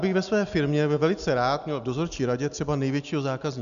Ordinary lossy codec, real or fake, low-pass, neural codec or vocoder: MP3, 96 kbps; real; 7.2 kHz; none